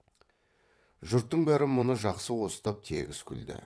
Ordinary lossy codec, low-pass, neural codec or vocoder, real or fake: none; none; vocoder, 22.05 kHz, 80 mel bands, WaveNeXt; fake